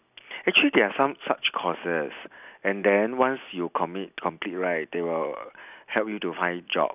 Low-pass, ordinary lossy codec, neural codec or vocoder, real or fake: 3.6 kHz; none; none; real